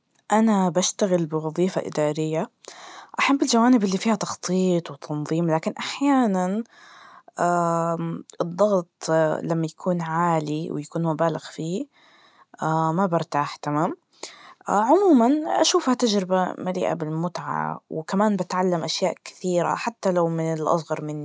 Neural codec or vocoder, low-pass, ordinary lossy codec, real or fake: none; none; none; real